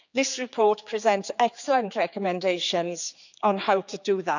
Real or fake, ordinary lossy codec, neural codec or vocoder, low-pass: fake; none; codec, 16 kHz, 4 kbps, X-Codec, HuBERT features, trained on general audio; 7.2 kHz